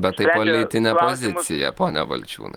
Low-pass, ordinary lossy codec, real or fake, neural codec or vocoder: 19.8 kHz; Opus, 32 kbps; real; none